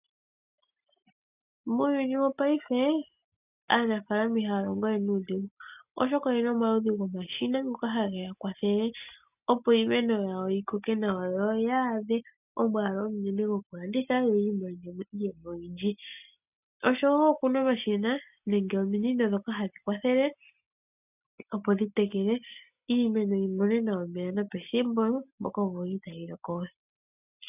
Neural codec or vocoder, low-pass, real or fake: none; 3.6 kHz; real